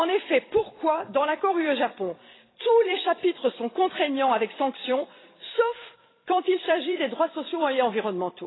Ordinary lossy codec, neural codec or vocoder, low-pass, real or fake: AAC, 16 kbps; vocoder, 44.1 kHz, 128 mel bands every 256 samples, BigVGAN v2; 7.2 kHz; fake